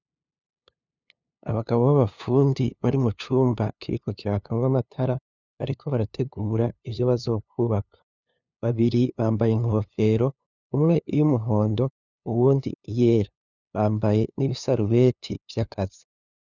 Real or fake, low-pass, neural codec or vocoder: fake; 7.2 kHz; codec, 16 kHz, 2 kbps, FunCodec, trained on LibriTTS, 25 frames a second